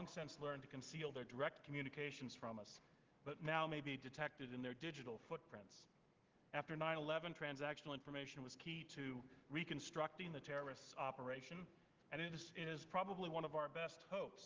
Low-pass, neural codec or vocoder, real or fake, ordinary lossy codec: 7.2 kHz; none; real; Opus, 16 kbps